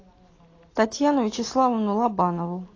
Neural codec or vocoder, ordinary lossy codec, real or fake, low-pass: none; AAC, 32 kbps; real; 7.2 kHz